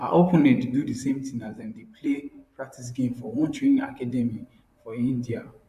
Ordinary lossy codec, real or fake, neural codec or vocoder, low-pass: AAC, 96 kbps; fake; vocoder, 44.1 kHz, 128 mel bands, Pupu-Vocoder; 14.4 kHz